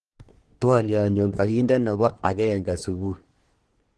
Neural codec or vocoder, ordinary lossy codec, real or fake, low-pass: codec, 24 kHz, 1 kbps, SNAC; Opus, 16 kbps; fake; 10.8 kHz